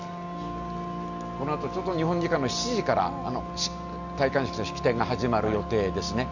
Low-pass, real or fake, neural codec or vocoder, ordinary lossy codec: 7.2 kHz; real; none; none